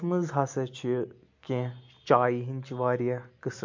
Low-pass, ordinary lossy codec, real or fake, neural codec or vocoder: 7.2 kHz; MP3, 48 kbps; real; none